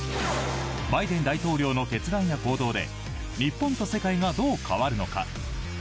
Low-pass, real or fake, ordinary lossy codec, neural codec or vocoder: none; real; none; none